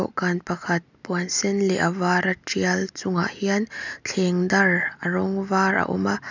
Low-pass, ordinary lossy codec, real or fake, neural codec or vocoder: 7.2 kHz; none; real; none